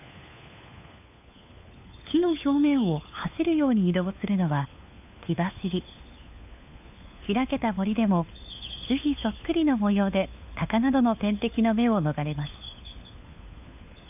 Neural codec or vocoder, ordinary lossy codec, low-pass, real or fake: codec, 16 kHz, 4 kbps, FunCodec, trained on LibriTTS, 50 frames a second; AAC, 32 kbps; 3.6 kHz; fake